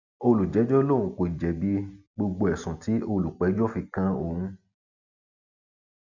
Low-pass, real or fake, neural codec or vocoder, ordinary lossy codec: 7.2 kHz; real; none; none